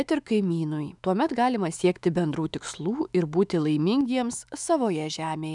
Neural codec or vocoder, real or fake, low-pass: autoencoder, 48 kHz, 128 numbers a frame, DAC-VAE, trained on Japanese speech; fake; 10.8 kHz